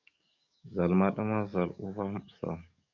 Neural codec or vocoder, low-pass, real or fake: codec, 44.1 kHz, 7.8 kbps, DAC; 7.2 kHz; fake